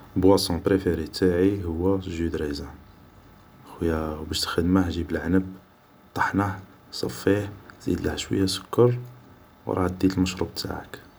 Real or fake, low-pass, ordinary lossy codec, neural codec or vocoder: real; none; none; none